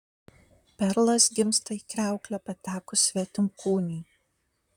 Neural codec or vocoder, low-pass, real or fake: vocoder, 44.1 kHz, 128 mel bands, Pupu-Vocoder; 19.8 kHz; fake